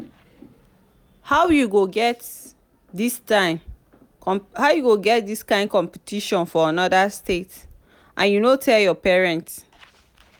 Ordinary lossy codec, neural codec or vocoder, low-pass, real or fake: none; none; none; real